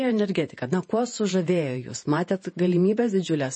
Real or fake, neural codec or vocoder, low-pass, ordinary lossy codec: fake; vocoder, 48 kHz, 128 mel bands, Vocos; 9.9 kHz; MP3, 32 kbps